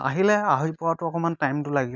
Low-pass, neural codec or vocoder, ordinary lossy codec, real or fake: 7.2 kHz; none; none; real